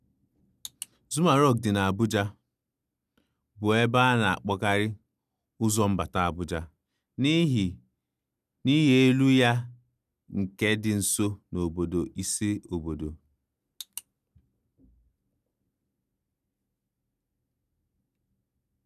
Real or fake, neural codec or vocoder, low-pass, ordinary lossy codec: real; none; 14.4 kHz; none